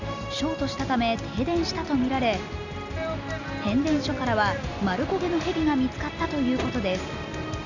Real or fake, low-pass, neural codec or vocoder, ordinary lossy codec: real; 7.2 kHz; none; none